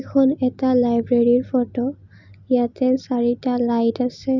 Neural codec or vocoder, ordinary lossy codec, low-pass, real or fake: none; none; 7.2 kHz; real